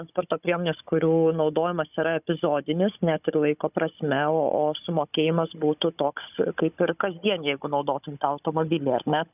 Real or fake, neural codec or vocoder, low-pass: fake; codec, 16 kHz, 16 kbps, FunCodec, trained on Chinese and English, 50 frames a second; 3.6 kHz